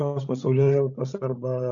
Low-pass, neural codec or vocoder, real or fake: 7.2 kHz; codec, 16 kHz, 16 kbps, FreqCodec, larger model; fake